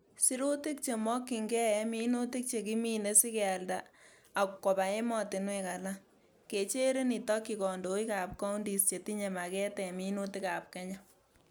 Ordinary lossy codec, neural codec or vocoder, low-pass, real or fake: none; none; none; real